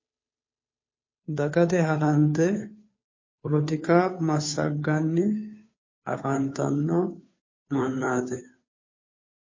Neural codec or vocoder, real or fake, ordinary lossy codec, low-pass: codec, 16 kHz, 2 kbps, FunCodec, trained on Chinese and English, 25 frames a second; fake; MP3, 32 kbps; 7.2 kHz